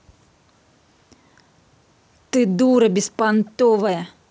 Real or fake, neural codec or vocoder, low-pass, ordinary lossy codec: real; none; none; none